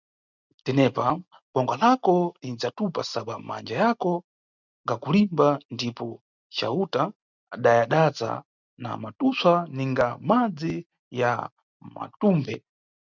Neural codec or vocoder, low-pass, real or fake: none; 7.2 kHz; real